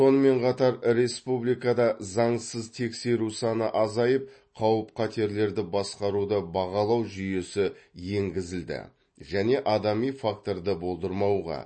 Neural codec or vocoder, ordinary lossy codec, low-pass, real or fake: none; MP3, 32 kbps; 9.9 kHz; real